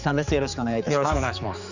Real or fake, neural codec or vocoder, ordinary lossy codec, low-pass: fake; codec, 16 kHz, 4 kbps, X-Codec, HuBERT features, trained on general audio; none; 7.2 kHz